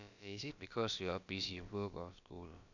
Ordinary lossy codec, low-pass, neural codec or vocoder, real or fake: none; 7.2 kHz; codec, 16 kHz, about 1 kbps, DyCAST, with the encoder's durations; fake